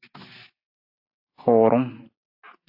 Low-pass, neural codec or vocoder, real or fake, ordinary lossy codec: 5.4 kHz; none; real; AAC, 48 kbps